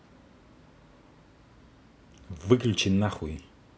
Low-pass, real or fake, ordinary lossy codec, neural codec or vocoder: none; real; none; none